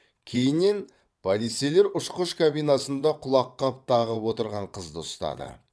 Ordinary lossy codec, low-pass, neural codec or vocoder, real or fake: none; none; vocoder, 22.05 kHz, 80 mel bands, WaveNeXt; fake